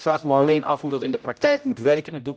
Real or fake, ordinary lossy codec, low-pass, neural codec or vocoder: fake; none; none; codec, 16 kHz, 0.5 kbps, X-Codec, HuBERT features, trained on general audio